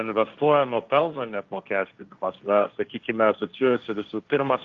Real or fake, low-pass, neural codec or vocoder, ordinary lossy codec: fake; 7.2 kHz; codec, 16 kHz, 1.1 kbps, Voila-Tokenizer; Opus, 24 kbps